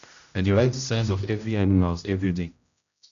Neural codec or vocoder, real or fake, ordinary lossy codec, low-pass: codec, 16 kHz, 0.5 kbps, X-Codec, HuBERT features, trained on general audio; fake; none; 7.2 kHz